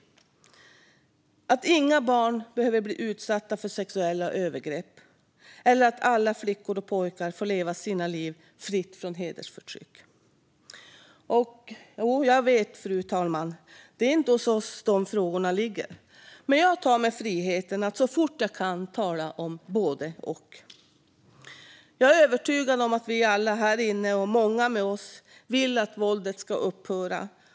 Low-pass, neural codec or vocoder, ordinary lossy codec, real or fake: none; none; none; real